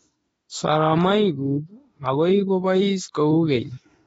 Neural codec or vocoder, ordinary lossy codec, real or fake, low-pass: autoencoder, 48 kHz, 32 numbers a frame, DAC-VAE, trained on Japanese speech; AAC, 24 kbps; fake; 19.8 kHz